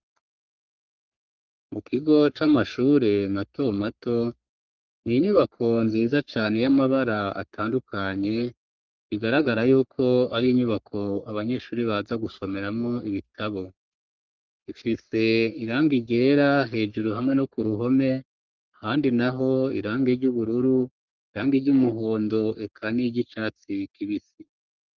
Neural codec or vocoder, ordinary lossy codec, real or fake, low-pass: codec, 44.1 kHz, 3.4 kbps, Pupu-Codec; Opus, 32 kbps; fake; 7.2 kHz